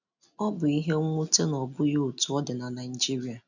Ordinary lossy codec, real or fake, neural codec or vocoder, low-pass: none; real; none; 7.2 kHz